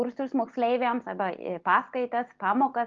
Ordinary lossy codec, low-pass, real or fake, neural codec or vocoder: Opus, 32 kbps; 7.2 kHz; real; none